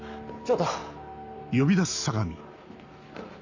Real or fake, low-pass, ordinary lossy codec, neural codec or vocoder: real; 7.2 kHz; none; none